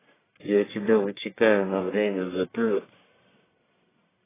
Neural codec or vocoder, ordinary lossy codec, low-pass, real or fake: codec, 44.1 kHz, 1.7 kbps, Pupu-Codec; AAC, 16 kbps; 3.6 kHz; fake